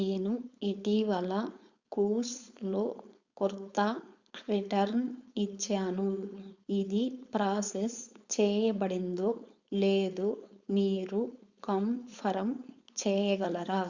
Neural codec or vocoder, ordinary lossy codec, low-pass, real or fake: codec, 16 kHz, 4.8 kbps, FACodec; Opus, 64 kbps; 7.2 kHz; fake